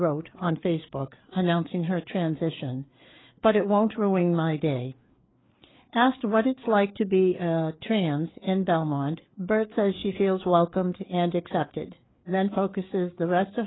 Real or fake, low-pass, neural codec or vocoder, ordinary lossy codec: fake; 7.2 kHz; codec, 16 kHz, 4 kbps, FreqCodec, larger model; AAC, 16 kbps